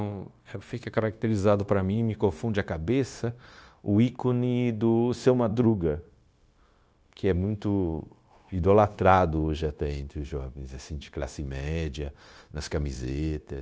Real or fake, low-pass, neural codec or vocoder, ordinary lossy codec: fake; none; codec, 16 kHz, 0.9 kbps, LongCat-Audio-Codec; none